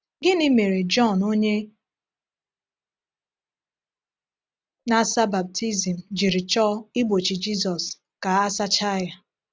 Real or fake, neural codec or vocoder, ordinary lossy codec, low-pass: real; none; none; none